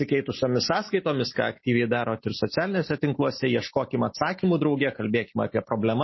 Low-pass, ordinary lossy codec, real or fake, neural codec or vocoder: 7.2 kHz; MP3, 24 kbps; real; none